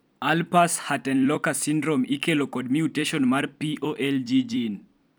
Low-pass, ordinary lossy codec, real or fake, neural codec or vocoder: none; none; fake; vocoder, 44.1 kHz, 128 mel bands every 256 samples, BigVGAN v2